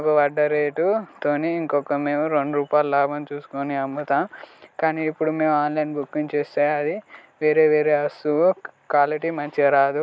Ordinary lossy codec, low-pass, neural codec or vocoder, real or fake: none; none; none; real